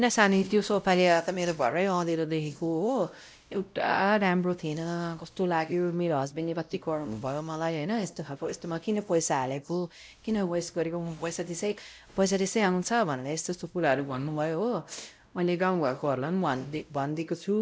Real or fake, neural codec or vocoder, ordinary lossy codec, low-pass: fake; codec, 16 kHz, 0.5 kbps, X-Codec, WavLM features, trained on Multilingual LibriSpeech; none; none